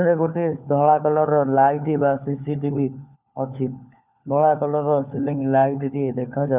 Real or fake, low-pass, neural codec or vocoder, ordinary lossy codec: fake; 3.6 kHz; codec, 16 kHz, 4 kbps, FunCodec, trained on LibriTTS, 50 frames a second; none